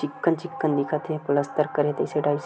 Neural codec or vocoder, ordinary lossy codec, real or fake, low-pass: none; none; real; none